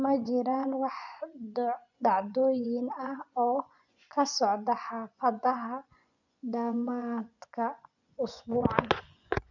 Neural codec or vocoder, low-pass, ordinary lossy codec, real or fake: vocoder, 24 kHz, 100 mel bands, Vocos; 7.2 kHz; none; fake